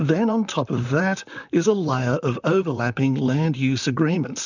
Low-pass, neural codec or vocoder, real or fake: 7.2 kHz; vocoder, 44.1 kHz, 128 mel bands, Pupu-Vocoder; fake